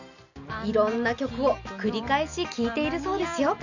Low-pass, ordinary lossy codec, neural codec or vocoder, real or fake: 7.2 kHz; none; none; real